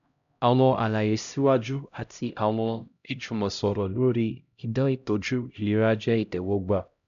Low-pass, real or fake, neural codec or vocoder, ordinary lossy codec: 7.2 kHz; fake; codec, 16 kHz, 0.5 kbps, X-Codec, HuBERT features, trained on LibriSpeech; none